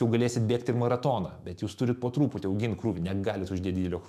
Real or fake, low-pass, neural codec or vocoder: fake; 14.4 kHz; autoencoder, 48 kHz, 128 numbers a frame, DAC-VAE, trained on Japanese speech